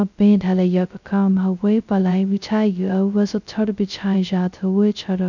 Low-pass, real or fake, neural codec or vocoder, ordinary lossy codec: 7.2 kHz; fake; codec, 16 kHz, 0.2 kbps, FocalCodec; none